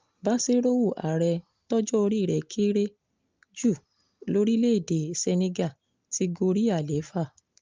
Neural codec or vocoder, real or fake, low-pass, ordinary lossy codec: none; real; 7.2 kHz; Opus, 24 kbps